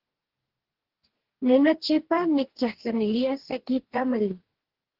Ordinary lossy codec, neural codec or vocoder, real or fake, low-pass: Opus, 16 kbps; codec, 44.1 kHz, 2.6 kbps, DAC; fake; 5.4 kHz